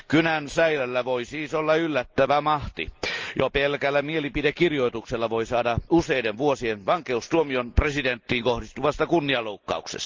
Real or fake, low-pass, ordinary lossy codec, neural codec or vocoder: real; 7.2 kHz; Opus, 24 kbps; none